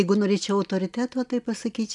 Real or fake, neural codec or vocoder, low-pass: real; none; 10.8 kHz